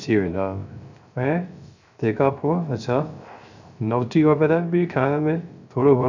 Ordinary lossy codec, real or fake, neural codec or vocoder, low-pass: none; fake; codec, 16 kHz, 0.3 kbps, FocalCodec; 7.2 kHz